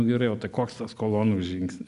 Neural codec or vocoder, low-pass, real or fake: none; 10.8 kHz; real